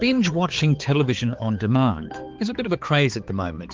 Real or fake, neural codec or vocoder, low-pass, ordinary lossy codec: fake; codec, 16 kHz, 4 kbps, X-Codec, HuBERT features, trained on balanced general audio; 7.2 kHz; Opus, 16 kbps